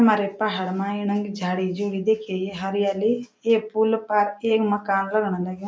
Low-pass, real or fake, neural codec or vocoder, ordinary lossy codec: none; real; none; none